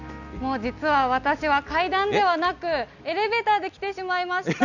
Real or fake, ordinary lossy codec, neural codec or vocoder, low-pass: real; none; none; 7.2 kHz